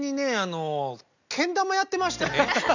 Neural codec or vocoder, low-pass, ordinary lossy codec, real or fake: none; 7.2 kHz; none; real